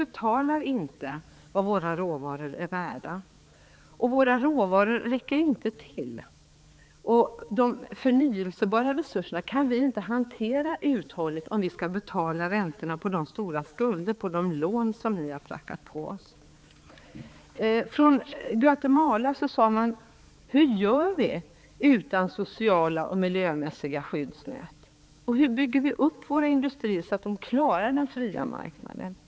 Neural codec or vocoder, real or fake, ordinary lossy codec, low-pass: codec, 16 kHz, 4 kbps, X-Codec, HuBERT features, trained on balanced general audio; fake; none; none